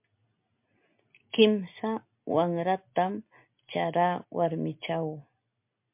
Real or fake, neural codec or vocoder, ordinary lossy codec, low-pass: real; none; MP3, 32 kbps; 3.6 kHz